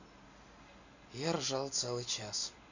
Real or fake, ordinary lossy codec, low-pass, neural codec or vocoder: real; none; 7.2 kHz; none